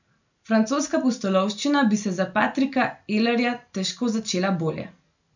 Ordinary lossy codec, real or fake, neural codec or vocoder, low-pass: none; real; none; 7.2 kHz